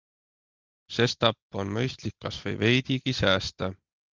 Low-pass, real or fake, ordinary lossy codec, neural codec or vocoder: 7.2 kHz; real; Opus, 24 kbps; none